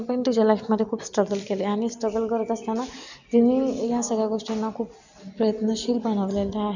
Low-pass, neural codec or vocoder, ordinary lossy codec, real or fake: 7.2 kHz; none; none; real